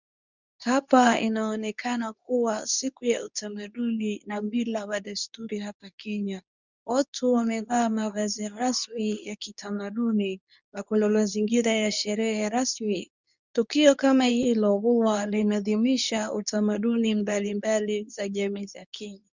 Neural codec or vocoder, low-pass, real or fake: codec, 24 kHz, 0.9 kbps, WavTokenizer, medium speech release version 2; 7.2 kHz; fake